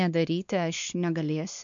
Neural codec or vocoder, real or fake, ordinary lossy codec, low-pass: codec, 16 kHz, 2 kbps, X-Codec, WavLM features, trained on Multilingual LibriSpeech; fake; MP3, 64 kbps; 7.2 kHz